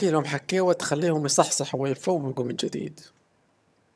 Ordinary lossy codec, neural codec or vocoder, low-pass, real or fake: none; vocoder, 22.05 kHz, 80 mel bands, HiFi-GAN; none; fake